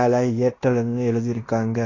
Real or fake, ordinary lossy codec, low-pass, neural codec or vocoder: fake; AAC, 32 kbps; 7.2 kHz; codec, 16 kHz in and 24 kHz out, 0.9 kbps, LongCat-Audio-Codec, fine tuned four codebook decoder